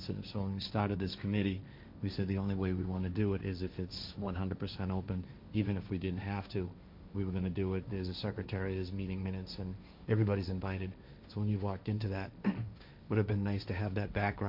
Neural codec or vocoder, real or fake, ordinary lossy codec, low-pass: codec, 16 kHz, 1.1 kbps, Voila-Tokenizer; fake; MP3, 48 kbps; 5.4 kHz